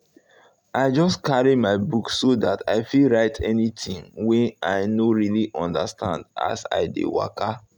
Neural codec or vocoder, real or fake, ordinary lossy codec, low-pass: none; real; none; 19.8 kHz